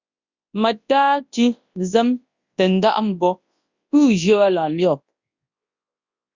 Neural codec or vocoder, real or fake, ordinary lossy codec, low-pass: codec, 24 kHz, 0.9 kbps, WavTokenizer, large speech release; fake; Opus, 64 kbps; 7.2 kHz